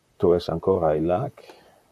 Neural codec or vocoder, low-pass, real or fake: vocoder, 44.1 kHz, 128 mel bands, Pupu-Vocoder; 14.4 kHz; fake